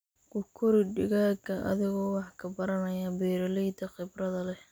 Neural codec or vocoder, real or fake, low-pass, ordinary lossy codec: none; real; none; none